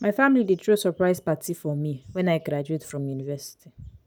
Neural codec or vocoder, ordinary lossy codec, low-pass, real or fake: none; none; none; real